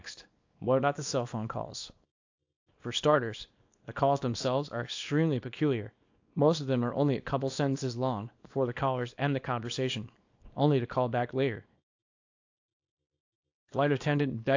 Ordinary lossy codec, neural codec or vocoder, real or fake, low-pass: AAC, 48 kbps; codec, 24 kHz, 0.9 kbps, WavTokenizer, small release; fake; 7.2 kHz